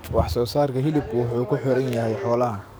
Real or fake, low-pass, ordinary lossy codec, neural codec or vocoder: fake; none; none; codec, 44.1 kHz, 7.8 kbps, DAC